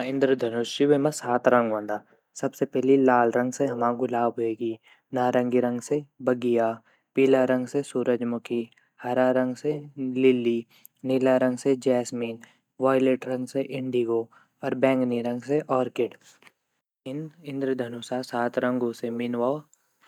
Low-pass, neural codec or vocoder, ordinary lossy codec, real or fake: 19.8 kHz; none; none; real